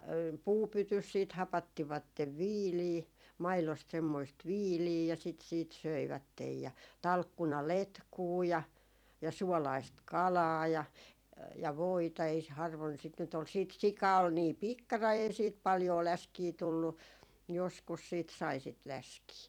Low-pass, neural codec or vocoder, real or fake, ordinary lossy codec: 19.8 kHz; vocoder, 44.1 kHz, 128 mel bands every 256 samples, BigVGAN v2; fake; none